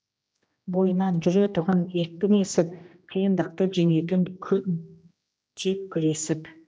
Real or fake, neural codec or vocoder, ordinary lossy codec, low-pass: fake; codec, 16 kHz, 1 kbps, X-Codec, HuBERT features, trained on general audio; none; none